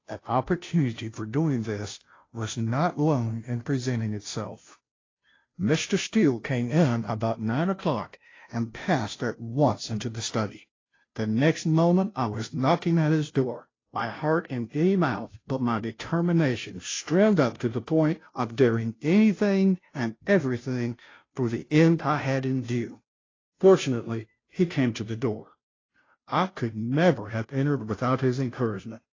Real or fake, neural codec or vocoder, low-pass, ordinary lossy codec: fake; codec, 16 kHz, 0.5 kbps, FunCodec, trained on Chinese and English, 25 frames a second; 7.2 kHz; AAC, 32 kbps